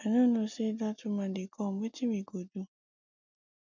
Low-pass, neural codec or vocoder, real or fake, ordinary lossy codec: 7.2 kHz; none; real; MP3, 64 kbps